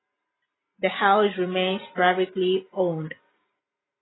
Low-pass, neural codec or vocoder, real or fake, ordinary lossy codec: 7.2 kHz; none; real; AAC, 16 kbps